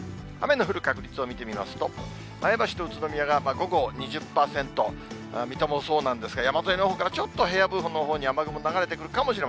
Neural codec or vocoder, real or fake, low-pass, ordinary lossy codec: none; real; none; none